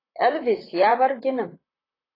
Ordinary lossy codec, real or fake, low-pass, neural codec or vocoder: AAC, 24 kbps; fake; 5.4 kHz; vocoder, 44.1 kHz, 128 mel bands, Pupu-Vocoder